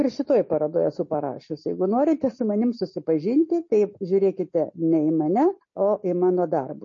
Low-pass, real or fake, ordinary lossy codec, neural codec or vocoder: 7.2 kHz; real; MP3, 32 kbps; none